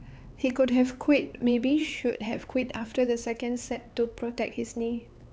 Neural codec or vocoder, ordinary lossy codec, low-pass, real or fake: codec, 16 kHz, 4 kbps, X-Codec, HuBERT features, trained on LibriSpeech; none; none; fake